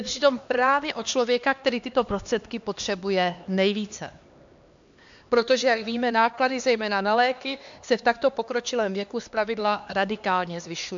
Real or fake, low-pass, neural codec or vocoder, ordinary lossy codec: fake; 7.2 kHz; codec, 16 kHz, 2 kbps, X-Codec, HuBERT features, trained on LibriSpeech; AAC, 64 kbps